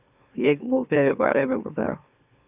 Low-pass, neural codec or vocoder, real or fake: 3.6 kHz; autoencoder, 44.1 kHz, a latent of 192 numbers a frame, MeloTTS; fake